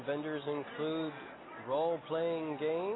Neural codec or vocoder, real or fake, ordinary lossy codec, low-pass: none; real; AAC, 16 kbps; 7.2 kHz